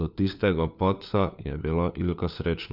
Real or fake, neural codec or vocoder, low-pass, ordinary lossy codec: fake; codec, 16 kHz, 4 kbps, FunCodec, trained on LibriTTS, 50 frames a second; 5.4 kHz; none